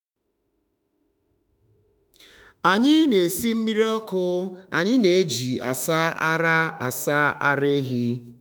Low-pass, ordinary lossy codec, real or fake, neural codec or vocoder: none; none; fake; autoencoder, 48 kHz, 32 numbers a frame, DAC-VAE, trained on Japanese speech